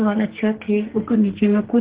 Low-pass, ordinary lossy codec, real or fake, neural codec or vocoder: 3.6 kHz; Opus, 16 kbps; fake; codec, 44.1 kHz, 2.6 kbps, SNAC